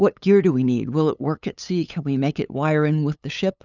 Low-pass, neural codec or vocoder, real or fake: 7.2 kHz; codec, 16 kHz, 8 kbps, FunCodec, trained on LibriTTS, 25 frames a second; fake